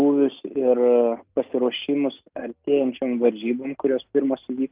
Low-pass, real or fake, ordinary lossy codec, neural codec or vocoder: 3.6 kHz; real; Opus, 24 kbps; none